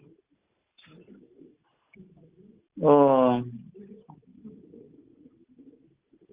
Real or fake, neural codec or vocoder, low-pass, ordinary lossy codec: real; none; 3.6 kHz; Opus, 32 kbps